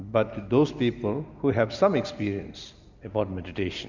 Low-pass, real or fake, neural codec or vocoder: 7.2 kHz; real; none